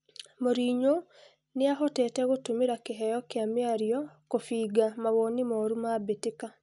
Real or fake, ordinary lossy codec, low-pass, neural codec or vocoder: real; none; 10.8 kHz; none